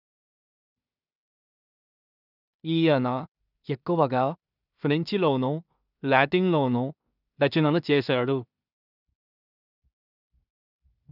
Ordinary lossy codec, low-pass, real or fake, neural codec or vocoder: none; 5.4 kHz; fake; codec, 16 kHz in and 24 kHz out, 0.4 kbps, LongCat-Audio-Codec, two codebook decoder